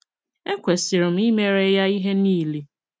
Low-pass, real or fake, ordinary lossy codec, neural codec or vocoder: none; real; none; none